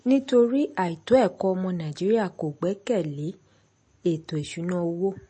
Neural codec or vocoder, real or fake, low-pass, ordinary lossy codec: none; real; 10.8 kHz; MP3, 32 kbps